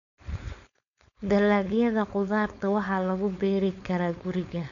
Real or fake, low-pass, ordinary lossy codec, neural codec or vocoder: fake; 7.2 kHz; none; codec, 16 kHz, 4.8 kbps, FACodec